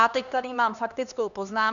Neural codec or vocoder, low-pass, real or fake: codec, 16 kHz, 2 kbps, X-Codec, HuBERT features, trained on LibriSpeech; 7.2 kHz; fake